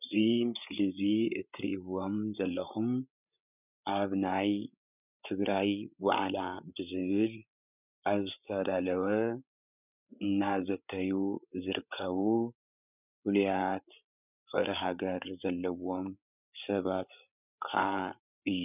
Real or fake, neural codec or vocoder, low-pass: fake; codec, 16 kHz, 8 kbps, FreqCodec, larger model; 3.6 kHz